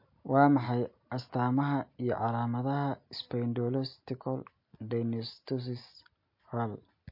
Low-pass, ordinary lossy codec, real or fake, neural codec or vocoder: 5.4 kHz; MP3, 32 kbps; real; none